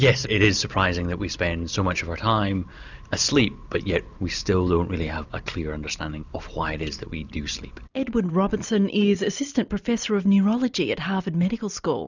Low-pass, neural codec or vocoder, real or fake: 7.2 kHz; none; real